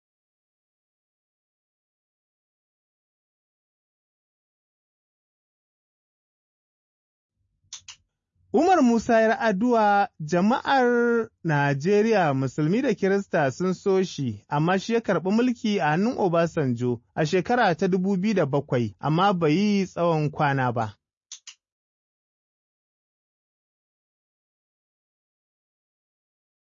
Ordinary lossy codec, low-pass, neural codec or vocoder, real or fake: MP3, 32 kbps; 7.2 kHz; none; real